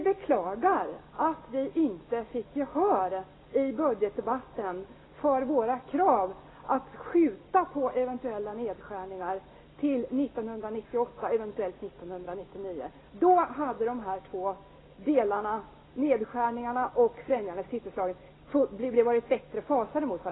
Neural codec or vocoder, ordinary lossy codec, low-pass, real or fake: none; AAC, 16 kbps; 7.2 kHz; real